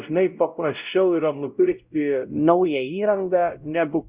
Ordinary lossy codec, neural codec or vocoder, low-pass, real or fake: Opus, 64 kbps; codec, 16 kHz, 0.5 kbps, X-Codec, WavLM features, trained on Multilingual LibriSpeech; 3.6 kHz; fake